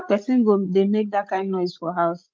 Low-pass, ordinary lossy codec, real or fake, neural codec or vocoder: 7.2 kHz; Opus, 24 kbps; fake; codec, 16 kHz, 16 kbps, FreqCodec, larger model